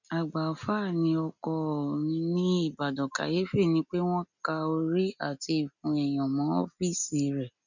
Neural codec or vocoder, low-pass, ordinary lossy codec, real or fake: none; 7.2 kHz; none; real